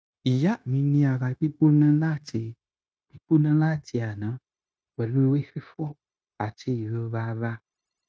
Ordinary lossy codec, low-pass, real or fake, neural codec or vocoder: none; none; fake; codec, 16 kHz, 0.9 kbps, LongCat-Audio-Codec